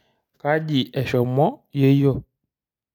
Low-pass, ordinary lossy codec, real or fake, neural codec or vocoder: 19.8 kHz; none; real; none